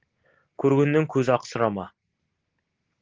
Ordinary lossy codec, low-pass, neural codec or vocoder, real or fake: Opus, 16 kbps; 7.2 kHz; none; real